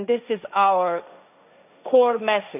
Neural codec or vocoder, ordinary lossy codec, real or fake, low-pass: codec, 16 kHz, 1.1 kbps, Voila-Tokenizer; none; fake; 3.6 kHz